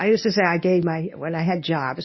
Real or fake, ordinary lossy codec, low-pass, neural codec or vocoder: fake; MP3, 24 kbps; 7.2 kHz; codec, 24 kHz, 0.9 kbps, WavTokenizer, medium speech release version 1